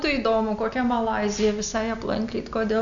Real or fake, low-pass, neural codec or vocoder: real; 7.2 kHz; none